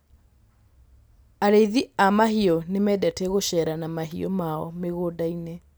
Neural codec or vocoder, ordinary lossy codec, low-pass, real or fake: none; none; none; real